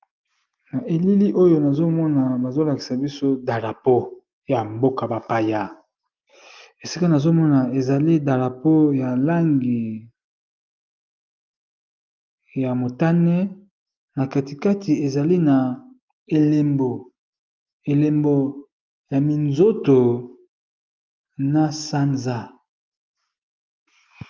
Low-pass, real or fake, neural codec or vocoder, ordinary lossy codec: 7.2 kHz; real; none; Opus, 32 kbps